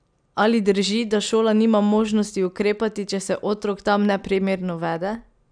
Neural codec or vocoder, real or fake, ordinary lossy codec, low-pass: none; real; none; 9.9 kHz